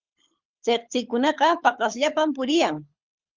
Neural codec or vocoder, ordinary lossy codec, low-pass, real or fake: codec, 24 kHz, 6 kbps, HILCodec; Opus, 32 kbps; 7.2 kHz; fake